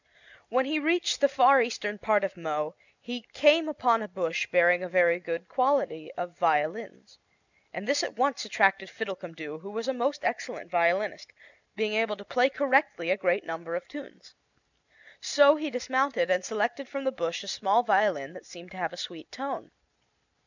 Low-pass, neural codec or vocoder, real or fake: 7.2 kHz; none; real